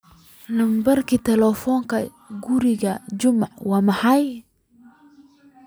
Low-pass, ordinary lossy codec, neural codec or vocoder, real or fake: none; none; none; real